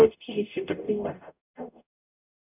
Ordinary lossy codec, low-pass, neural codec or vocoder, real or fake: none; 3.6 kHz; codec, 44.1 kHz, 0.9 kbps, DAC; fake